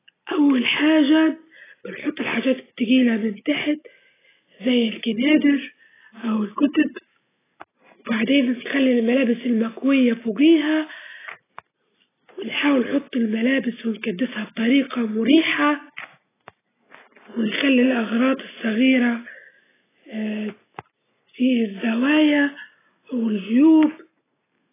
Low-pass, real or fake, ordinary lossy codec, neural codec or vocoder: 3.6 kHz; real; AAC, 16 kbps; none